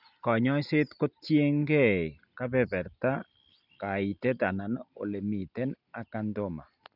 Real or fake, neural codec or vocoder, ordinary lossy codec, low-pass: real; none; none; 5.4 kHz